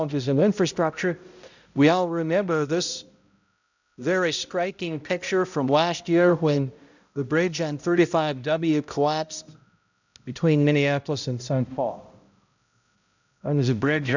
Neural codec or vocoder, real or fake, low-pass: codec, 16 kHz, 0.5 kbps, X-Codec, HuBERT features, trained on balanced general audio; fake; 7.2 kHz